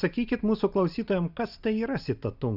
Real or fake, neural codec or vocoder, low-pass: real; none; 5.4 kHz